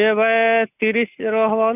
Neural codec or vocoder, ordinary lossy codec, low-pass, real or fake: none; none; 3.6 kHz; real